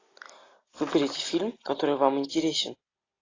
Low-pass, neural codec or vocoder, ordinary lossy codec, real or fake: 7.2 kHz; none; AAC, 32 kbps; real